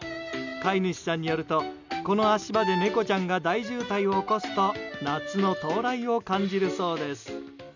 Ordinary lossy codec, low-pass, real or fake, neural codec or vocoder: none; 7.2 kHz; real; none